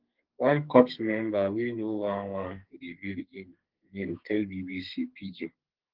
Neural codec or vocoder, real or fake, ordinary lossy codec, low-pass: codec, 32 kHz, 1.9 kbps, SNAC; fake; Opus, 16 kbps; 5.4 kHz